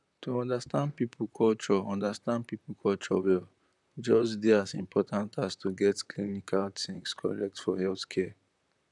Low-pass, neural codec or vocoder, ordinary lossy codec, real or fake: 10.8 kHz; vocoder, 44.1 kHz, 128 mel bands, Pupu-Vocoder; none; fake